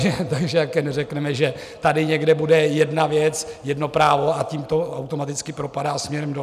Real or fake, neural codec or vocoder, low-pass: real; none; 14.4 kHz